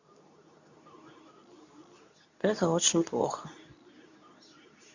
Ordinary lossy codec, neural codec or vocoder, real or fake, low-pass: none; codec, 24 kHz, 0.9 kbps, WavTokenizer, medium speech release version 2; fake; 7.2 kHz